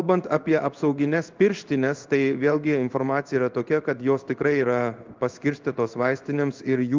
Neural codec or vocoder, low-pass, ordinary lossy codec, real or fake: codec, 16 kHz in and 24 kHz out, 1 kbps, XY-Tokenizer; 7.2 kHz; Opus, 24 kbps; fake